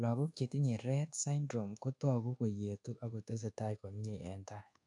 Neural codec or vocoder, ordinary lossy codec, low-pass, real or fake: codec, 24 kHz, 1.2 kbps, DualCodec; none; 10.8 kHz; fake